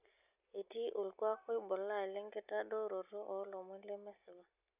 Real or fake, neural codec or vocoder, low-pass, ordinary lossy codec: real; none; 3.6 kHz; none